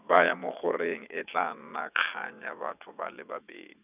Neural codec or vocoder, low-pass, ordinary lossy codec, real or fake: vocoder, 22.05 kHz, 80 mel bands, WaveNeXt; 3.6 kHz; none; fake